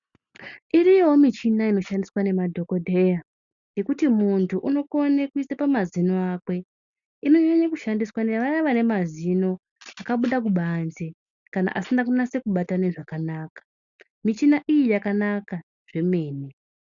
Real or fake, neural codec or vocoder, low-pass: real; none; 7.2 kHz